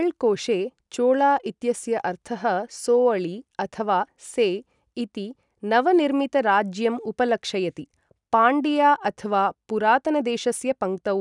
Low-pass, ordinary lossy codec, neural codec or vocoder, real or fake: 10.8 kHz; none; none; real